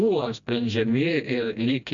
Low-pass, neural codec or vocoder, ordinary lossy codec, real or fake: 7.2 kHz; codec, 16 kHz, 1 kbps, FreqCodec, smaller model; MP3, 96 kbps; fake